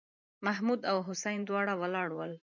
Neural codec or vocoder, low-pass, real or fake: vocoder, 44.1 kHz, 128 mel bands every 512 samples, BigVGAN v2; 7.2 kHz; fake